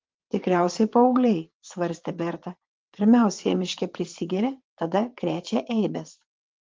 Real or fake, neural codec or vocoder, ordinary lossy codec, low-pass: fake; vocoder, 22.05 kHz, 80 mel bands, WaveNeXt; Opus, 32 kbps; 7.2 kHz